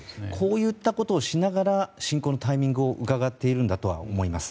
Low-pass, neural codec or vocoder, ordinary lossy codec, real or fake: none; none; none; real